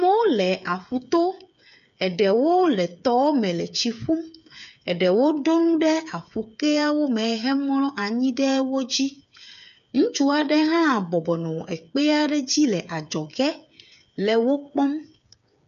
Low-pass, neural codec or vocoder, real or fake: 7.2 kHz; codec, 16 kHz, 8 kbps, FreqCodec, smaller model; fake